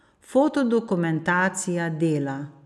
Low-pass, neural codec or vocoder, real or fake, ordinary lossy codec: none; none; real; none